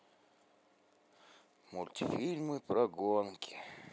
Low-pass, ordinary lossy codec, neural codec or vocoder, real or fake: none; none; none; real